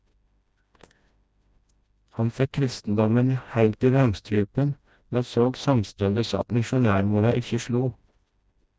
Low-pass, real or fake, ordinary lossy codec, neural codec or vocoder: none; fake; none; codec, 16 kHz, 1 kbps, FreqCodec, smaller model